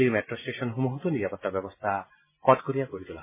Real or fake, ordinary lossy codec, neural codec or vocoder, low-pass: real; MP3, 16 kbps; none; 3.6 kHz